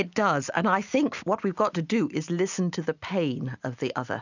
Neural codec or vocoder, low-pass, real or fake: none; 7.2 kHz; real